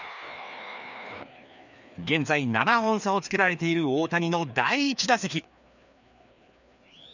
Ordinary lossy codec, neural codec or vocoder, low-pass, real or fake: none; codec, 16 kHz, 2 kbps, FreqCodec, larger model; 7.2 kHz; fake